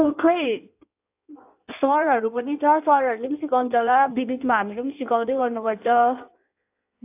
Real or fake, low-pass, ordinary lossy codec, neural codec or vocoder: fake; 3.6 kHz; AAC, 32 kbps; codec, 16 kHz in and 24 kHz out, 1.1 kbps, FireRedTTS-2 codec